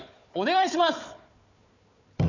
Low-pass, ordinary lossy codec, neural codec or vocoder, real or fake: 7.2 kHz; none; codec, 16 kHz, 4 kbps, FunCodec, trained on Chinese and English, 50 frames a second; fake